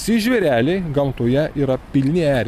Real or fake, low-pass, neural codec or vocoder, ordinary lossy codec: real; 14.4 kHz; none; MP3, 96 kbps